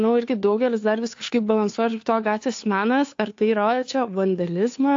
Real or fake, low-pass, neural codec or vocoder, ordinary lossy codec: fake; 7.2 kHz; codec, 16 kHz, 2 kbps, FunCodec, trained on Chinese and English, 25 frames a second; AAC, 48 kbps